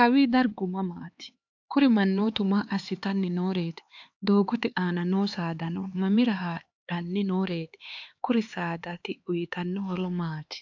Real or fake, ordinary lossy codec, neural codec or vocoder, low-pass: fake; AAC, 48 kbps; codec, 16 kHz, 4 kbps, X-Codec, HuBERT features, trained on LibriSpeech; 7.2 kHz